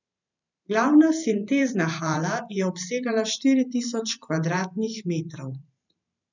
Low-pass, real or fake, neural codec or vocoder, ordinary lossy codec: 7.2 kHz; fake; vocoder, 44.1 kHz, 128 mel bands every 512 samples, BigVGAN v2; none